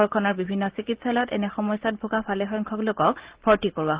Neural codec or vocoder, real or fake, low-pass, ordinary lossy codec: none; real; 3.6 kHz; Opus, 16 kbps